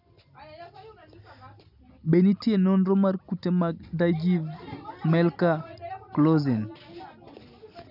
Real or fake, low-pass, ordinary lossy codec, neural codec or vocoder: real; 5.4 kHz; none; none